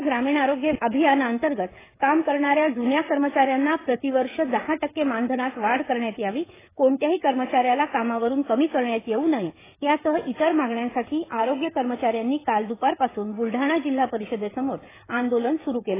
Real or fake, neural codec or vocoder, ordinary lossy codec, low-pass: fake; codec, 16 kHz, 16 kbps, FreqCodec, smaller model; AAC, 16 kbps; 3.6 kHz